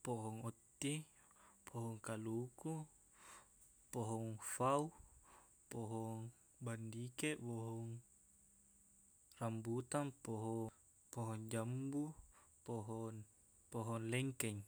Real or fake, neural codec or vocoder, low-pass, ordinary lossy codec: real; none; none; none